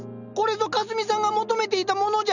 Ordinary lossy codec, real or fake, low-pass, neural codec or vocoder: none; real; 7.2 kHz; none